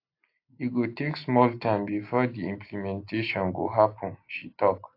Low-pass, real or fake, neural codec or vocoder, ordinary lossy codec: 5.4 kHz; real; none; AAC, 32 kbps